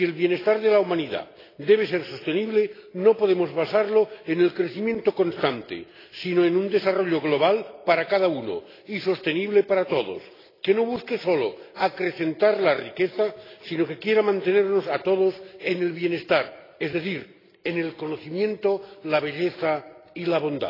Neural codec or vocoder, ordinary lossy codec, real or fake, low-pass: none; AAC, 24 kbps; real; 5.4 kHz